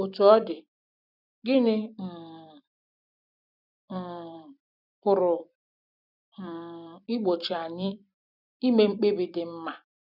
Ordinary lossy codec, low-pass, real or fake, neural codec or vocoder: none; 5.4 kHz; fake; vocoder, 44.1 kHz, 128 mel bands every 256 samples, BigVGAN v2